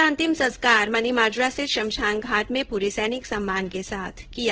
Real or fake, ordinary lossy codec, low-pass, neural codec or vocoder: fake; Opus, 16 kbps; 7.2 kHz; codec, 16 kHz in and 24 kHz out, 1 kbps, XY-Tokenizer